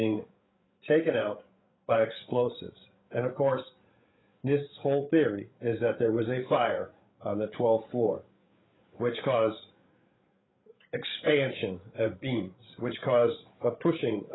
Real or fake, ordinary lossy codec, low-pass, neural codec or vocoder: fake; AAC, 16 kbps; 7.2 kHz; codec, 16 kHz, 8 kbps, FreqCodec, larger model